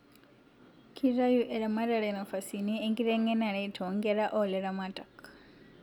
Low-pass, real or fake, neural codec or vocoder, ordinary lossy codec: 19.8 kHz; real; none; none